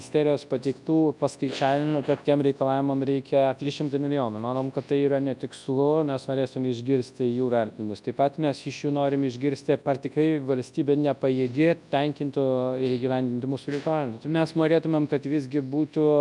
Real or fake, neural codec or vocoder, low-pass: fake; codec, 24 kHz, 0.9 kbps, WavTokenizer, large speech release; 10.8 kHz